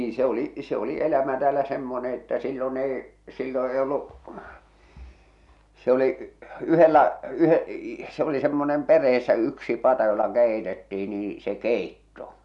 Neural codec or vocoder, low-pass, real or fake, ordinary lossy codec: none; 10.8 kHz; real; none